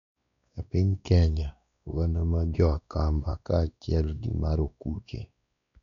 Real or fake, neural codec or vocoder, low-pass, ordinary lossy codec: fake; codec, 16 kHz, 2 kbps, X-Codec, WavLM features, trained on Multilingual LibriSpeech; 7.2 kHz; none